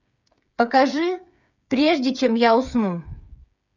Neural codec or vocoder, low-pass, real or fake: codec, 16 kHz, 8 kbps, FreqCodec, smaller model; 7.2 kHz; fake